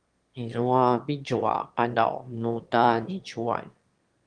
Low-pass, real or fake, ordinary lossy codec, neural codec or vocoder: 9.9 kHz; fake; Opus, 24 kbps; autoencoder, 22.05 kHz, a latent of 192 numbers a frame, VITS, trained on one speaker